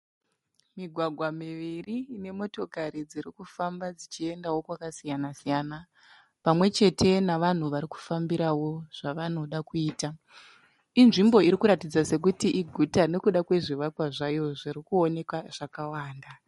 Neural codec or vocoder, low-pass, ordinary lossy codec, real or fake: none; 19.8 kHz; MP3, 48 kbps; real